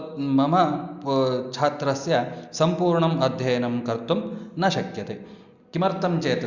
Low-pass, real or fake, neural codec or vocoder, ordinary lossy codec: 7.2 kHz; real; none; Opus, 64 kbps